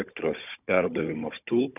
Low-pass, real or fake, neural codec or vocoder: 3.6 kHz; fake; codec, 16 kHz, 16 kbps, FunCodec, trained on Chinese and English, 50 frames a second